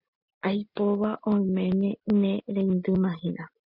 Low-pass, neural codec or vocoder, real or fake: 5.4 kHz; none; real